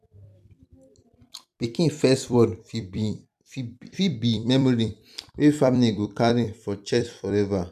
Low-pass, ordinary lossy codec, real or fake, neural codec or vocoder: 14.4 kHz; none; fake; vocoder, 44.1 kHz, 128 mel bands every 256 samples, BigVGAN v2